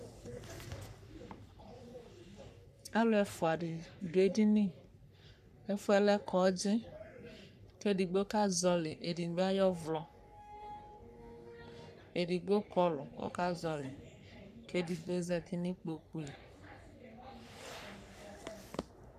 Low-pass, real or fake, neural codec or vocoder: 14.4 kHz; fake; codec, 44.1 kHz, 3.4 kbps, Pupu-Codec